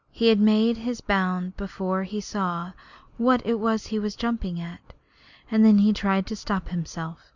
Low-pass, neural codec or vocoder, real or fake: 7.2 kHz; none; real